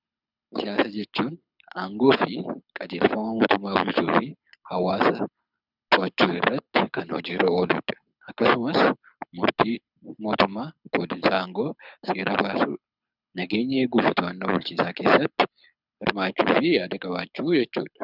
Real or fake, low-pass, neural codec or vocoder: fake; 5.4 kHz; codec, 24 kHz, 6 kbps, HILCodec